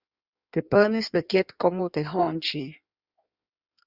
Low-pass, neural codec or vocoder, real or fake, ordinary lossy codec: 5.4 kHz; codec, 16 kHz in and 24 kHz out, 1.1 kbps, FireRedTTS-2 codec; fake; Opus, 64 kbps